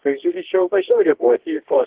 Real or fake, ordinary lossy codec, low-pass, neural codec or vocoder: fake; Opus, 16 kbps; 3.6 kHz; codec, 24 kHz, 0.9 kbps, WavTokenizer, medium music audio release